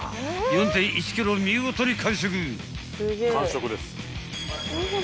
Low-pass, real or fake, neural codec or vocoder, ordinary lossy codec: none; real; none; none